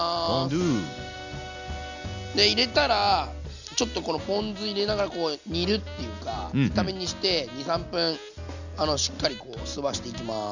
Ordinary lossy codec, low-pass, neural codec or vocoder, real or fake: none; 7.2 kHz; none; real